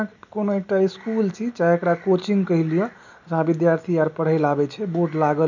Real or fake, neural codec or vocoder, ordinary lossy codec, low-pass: real; none; none; 7.2 kHz